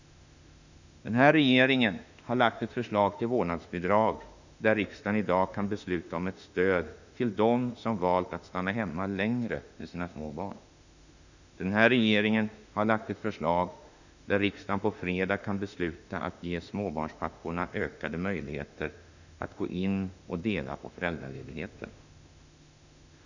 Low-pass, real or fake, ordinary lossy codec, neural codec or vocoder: 7.2 kHz; fake; none; autoencoder, 48 kHz, 32 numbers a frame, DAC-VAE, trained on Japanese speech